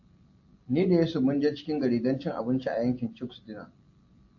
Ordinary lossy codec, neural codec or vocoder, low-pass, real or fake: AAC, 48 kbps; none; 7.2 kHz; real